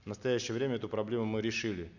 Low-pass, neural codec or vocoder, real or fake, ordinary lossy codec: 7.2 kHz; none; real; none